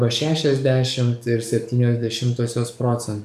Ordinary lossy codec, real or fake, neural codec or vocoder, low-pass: MP3, 96 kbps; fake; codec, 44.1 kHz, 7.8 kbps, DAC; 14.4 kHz